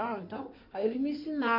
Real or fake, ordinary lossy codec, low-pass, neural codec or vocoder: fake; none; 5.4 kHz; codec, 16 kHz in and 24 kHz out, 2.2 kbps, FireRedTTS-2 codec